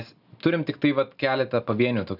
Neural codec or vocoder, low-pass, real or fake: none; 5.4 kHz; real